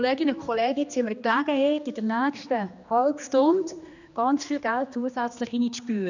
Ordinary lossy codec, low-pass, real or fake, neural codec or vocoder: none; 7.2 kHz; fake; codec, 16 kHz, 2 kbps, X-Codec, HuBERT features, trained on general audio